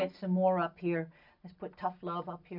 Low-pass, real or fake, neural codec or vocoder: 5.4 kHz; real; none